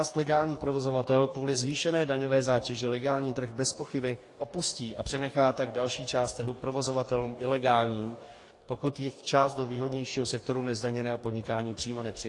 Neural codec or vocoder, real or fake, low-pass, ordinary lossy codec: codec, 44.1 kHz, 2.6 kbps, DAC; fake; 10.8 kHz; AAC, 48 kbps